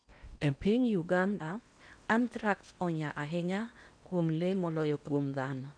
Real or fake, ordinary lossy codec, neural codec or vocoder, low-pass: fake; none; codec, 16 kHz in and 24 kHz out, 0.8 kbps, FocalCodec, streaming, 65536 codes; 9.9 kHz